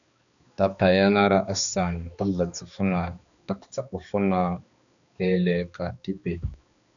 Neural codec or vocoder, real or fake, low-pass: codec, 16 kHz, 2 kbps, X-Codec, HuBERT features, trained on balanced general audio; fake; 7.2 kHz